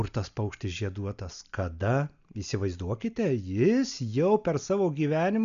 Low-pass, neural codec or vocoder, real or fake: 7.2 kHz; none; real